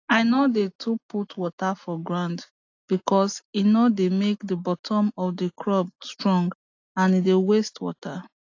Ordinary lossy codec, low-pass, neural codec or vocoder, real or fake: AAC, 48 kbps; 7.2 kHz; none; real